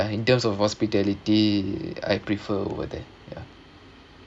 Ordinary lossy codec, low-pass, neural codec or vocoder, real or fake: none; none; none; real